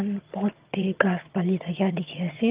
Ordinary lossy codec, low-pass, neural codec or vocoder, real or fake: Opus, 32 kbps; 3.6 kHz; vocoder, 22.05 kHz, 80 mel bands, HiFi-GAN; fake